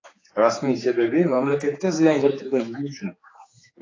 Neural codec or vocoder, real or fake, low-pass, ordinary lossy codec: codec, 16 kHz, 4 kbps, X-Codec, HuBERT features, trained on general audio; fake; 7.2 kHz; AAC, 32 kbps